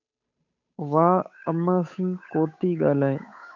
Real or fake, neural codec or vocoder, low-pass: fake; codec, 16 kHz, 8 kbps, FunCodec, trained on Chinese and English, 25 frames a second; 7.2 kHz